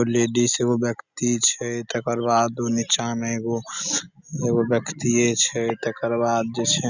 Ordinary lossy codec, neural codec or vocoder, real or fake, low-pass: none; none; real; 7.2 kHz